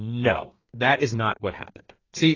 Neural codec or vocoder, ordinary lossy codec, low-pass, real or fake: codec, 32 kHz, 1.9 kbps, SNAC; AAC, 32 kbps; 7.2 kHz; fake